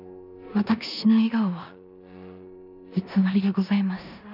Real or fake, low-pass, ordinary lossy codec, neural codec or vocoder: fake; 5.4 kHz; none; codec, 16 kHz in and 24 kHz out, 0.9 kbps, LongCat-Audio-Codec, four codebook decoder